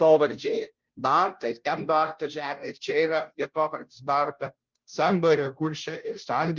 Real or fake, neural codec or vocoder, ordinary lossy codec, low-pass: fake; codec, 16 kHz, 0.5 kbps, FunCodec, trained on Chinese and English, 25 frames a second; Opus, 24 kbps; 7.2 kHz